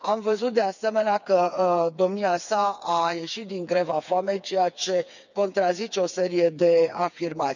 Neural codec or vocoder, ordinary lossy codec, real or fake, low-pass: codec, 16 kHz, 4 kbps, FreqCodec, smaller model; none; fake; 7.2 kHz